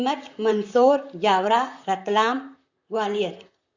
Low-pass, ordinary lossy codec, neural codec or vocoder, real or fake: 7.2 kHz; Opus, 64 kbps; vocoder, 44.1 kHz, 128 mel bands, Pupu-Vocoder; fake